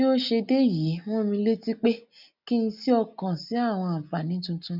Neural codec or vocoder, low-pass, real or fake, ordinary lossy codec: none; 5.4 kHz; real; none